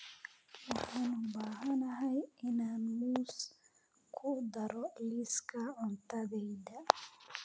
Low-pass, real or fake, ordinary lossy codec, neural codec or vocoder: none; real; none; none